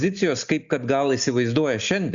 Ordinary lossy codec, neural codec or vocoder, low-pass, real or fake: Opus, 64 kbps; none; 7.2 kHz; real